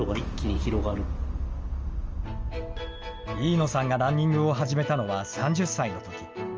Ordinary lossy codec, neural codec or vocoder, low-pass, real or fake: Opus, 24 kbps; none; 7.2 kHz; real